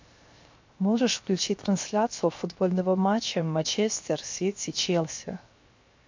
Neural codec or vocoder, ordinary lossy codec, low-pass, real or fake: codec, 16 kHz, 0.7 kbps, FocalCodec; MP3, 48 kbps; 7.2 kHz; fake